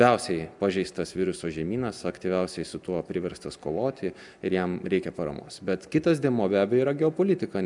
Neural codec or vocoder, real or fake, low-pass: none; real; 10.8 kHz